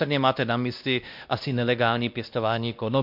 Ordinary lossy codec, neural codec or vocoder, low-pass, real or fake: MP3, 48 kbps; codec, 16 kHz, 1 kbps, X-Codec, WavLM features, trained on Multilingual LibriSpeech; 5.4 kHz; fake